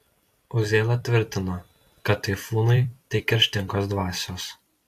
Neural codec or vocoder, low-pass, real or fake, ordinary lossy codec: vocoder, 44.1 kHz, 128 mel bands every 256 samples, BigVGAN v2; 14.4 kHz; fake; AAC, 64 kbps